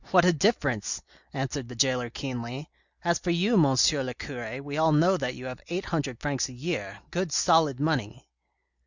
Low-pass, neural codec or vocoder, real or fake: 7.2 kHz; none; real